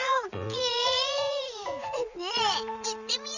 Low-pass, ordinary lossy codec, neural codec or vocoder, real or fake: 7.2 kHz; none; vocoder, 44.1 kHz, 80 mel bands, Vocos; fake